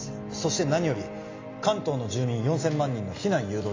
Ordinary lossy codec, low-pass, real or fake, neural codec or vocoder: AAC, 32 kbps; 7.2 kHz; real; none